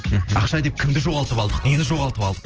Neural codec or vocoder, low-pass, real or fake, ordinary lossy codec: none; 7.2 kHz; real; Opus, 16 kbps